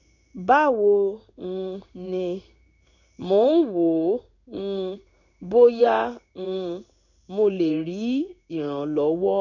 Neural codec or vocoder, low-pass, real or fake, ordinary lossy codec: codec, 16 kHz in and 24 kHz out, 1 kbps, XY-Tokenizer; 7.2 kHz; fake; none